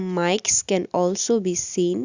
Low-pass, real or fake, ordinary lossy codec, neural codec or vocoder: 7.2 kHz; real; Opus, 64 kbps; none